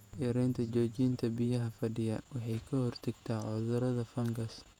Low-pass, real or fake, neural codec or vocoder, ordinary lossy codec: 19.8 kHz; fake; vocoder, 48 kHz, 128 mel bands, Vocos; none